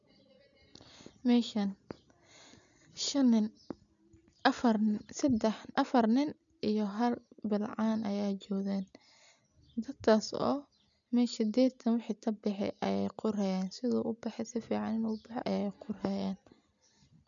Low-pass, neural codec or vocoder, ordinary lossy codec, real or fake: 7.2 kHz; none; none; real